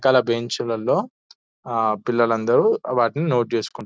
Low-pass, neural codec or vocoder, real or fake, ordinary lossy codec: none; none; real; none